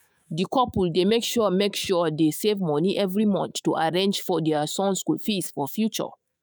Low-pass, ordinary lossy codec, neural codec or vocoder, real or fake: none; none; autoencoder, 48 kHz, 128 numbers a frame, DAC-VAE, trained on Japanese speech; fake